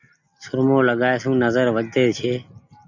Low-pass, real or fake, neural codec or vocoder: 7.2 kHz; real; none